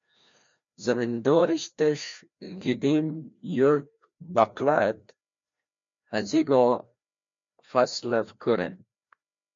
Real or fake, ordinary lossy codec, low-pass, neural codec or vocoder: fake; MP3, 48 kbps; 7.2 kHz; codec, 16 kHz, 1 kbps, FreqCodec, larger model